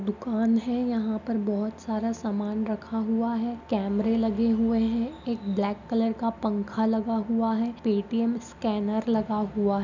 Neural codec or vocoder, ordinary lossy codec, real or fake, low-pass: none; none; real; 7.2 kHz